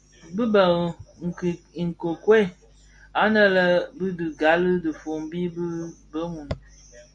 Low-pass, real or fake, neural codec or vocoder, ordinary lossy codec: 9.9 kHz; real; none; Opus, 64 kbps